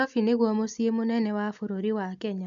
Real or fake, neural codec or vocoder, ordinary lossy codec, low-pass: real; none; MP3, 96 kbps; 7.2 kHz